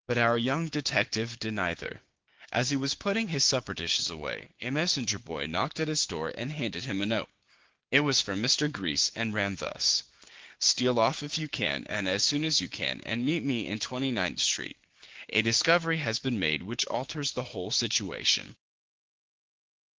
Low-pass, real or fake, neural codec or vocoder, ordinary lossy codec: 7.2 kHz; fake; codec, 16 kHz, 6 kbps, DAC; Opus, 16 kbps